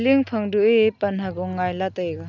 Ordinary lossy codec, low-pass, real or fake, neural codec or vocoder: none; 7.2 kHz; real; none